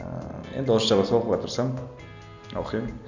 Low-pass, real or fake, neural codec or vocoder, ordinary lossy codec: 7.2 kHz; real; none; none